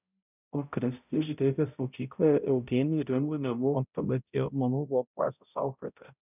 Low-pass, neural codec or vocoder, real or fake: 3.6 kHz; codec, 16 kHz, 0.5 kbps, X-Codec, HuBERT features, trained on balanced general audio; fake